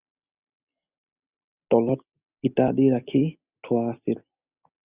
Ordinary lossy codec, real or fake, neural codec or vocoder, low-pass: Opus, 64 kbps; real; none; 3.6 kHz